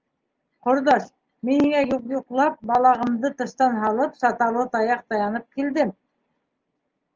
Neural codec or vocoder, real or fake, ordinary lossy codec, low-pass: none; real; Opus, 24 kbps; 7.2 kHz